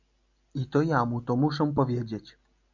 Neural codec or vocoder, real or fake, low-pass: none; real; 7.2 kHz